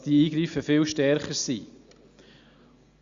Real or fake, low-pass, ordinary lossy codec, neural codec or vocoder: real; 7.2 kHz; Opus, 64 kbps; none